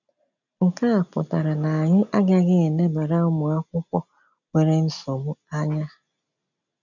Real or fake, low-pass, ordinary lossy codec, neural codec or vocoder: real; 7.2 kHz; none; none